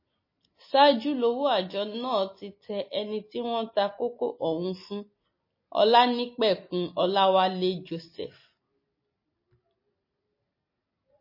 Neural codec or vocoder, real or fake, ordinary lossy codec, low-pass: none; real; MP3, 24 kbps; 5.4 kHz